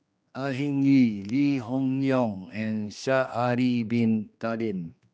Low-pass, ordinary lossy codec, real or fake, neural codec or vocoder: none; none; fake; codec, 16 kHz, 2 kbps, X-Codec, HuBERT features, trained on general audio